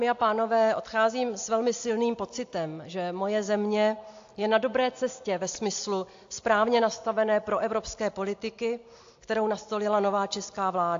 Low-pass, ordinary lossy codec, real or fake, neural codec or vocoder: 7.2 kHz; AAC, 48 kbps; real; none